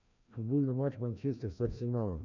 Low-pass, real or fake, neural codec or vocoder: 7.2 kHz; fake; codec, 16 kHz, 1 kbps, FreqCodec, larger model